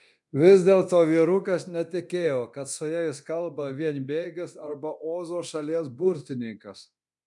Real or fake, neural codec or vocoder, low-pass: fake; codec, 24 kHz, 0.9 kbps, DualCodec; 10.8 kHz